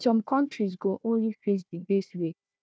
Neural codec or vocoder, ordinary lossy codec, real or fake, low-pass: codec, 16 kHz, 1 kbps, FunCodec, trained on Chinese and English, 50 frames a second; none; fake; none